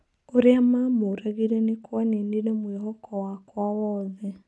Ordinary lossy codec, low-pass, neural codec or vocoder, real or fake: none; none; none; real